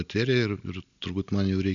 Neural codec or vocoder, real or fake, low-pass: none; real; 7.2 kHz